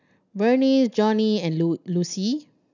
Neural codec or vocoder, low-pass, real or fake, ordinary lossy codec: none; 7.2 kHz; real; none